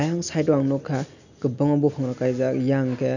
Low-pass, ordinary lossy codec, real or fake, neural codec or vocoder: 7.2 kHz; none; real; none